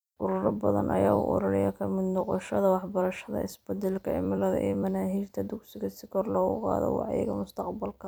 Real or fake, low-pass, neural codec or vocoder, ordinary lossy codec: fake; none; vocoder, 44.1 kHz, 128 mel bands every 256 samples, BigVGAN v2; none